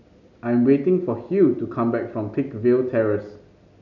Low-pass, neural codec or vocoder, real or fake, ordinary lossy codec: 7.2 kHz; none; real; none